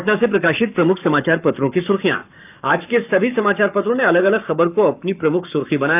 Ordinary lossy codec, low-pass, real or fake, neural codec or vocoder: none; 3.6 kHz; fake; codec, 44.1 kHz, 7.8 kbps, Pupu-Codec